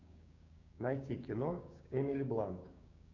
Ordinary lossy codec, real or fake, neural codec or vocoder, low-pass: MP3, 64 kbps; fake; codec, 16 kHz, 6 kbps, DAC; 7.2 kHz